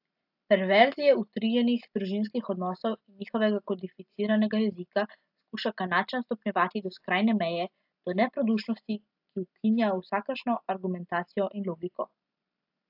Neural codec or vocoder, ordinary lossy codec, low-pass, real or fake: none; AAC, 48 kbps; 5.4 kHz; real